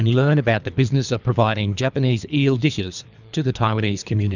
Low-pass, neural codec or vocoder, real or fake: 7.2 kHz; codec, 24 kHz, 3 kbps, HILCodec; fake